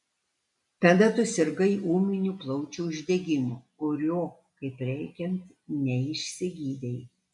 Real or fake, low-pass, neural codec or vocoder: real; 10.8 kHz; none